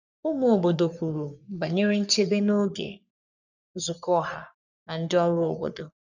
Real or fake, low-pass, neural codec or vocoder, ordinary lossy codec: fake; 7.2 kHz; codec, 44.1 kHz, 3.4 kbps, Pupu-Codec; none